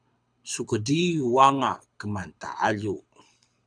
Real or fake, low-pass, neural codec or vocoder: fake; 9.9 kHz; codec, 24 kHz, 6 kbps, HILCodec